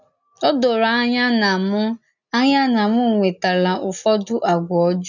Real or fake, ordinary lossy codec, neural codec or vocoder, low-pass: real; none; none; 7.2 kHz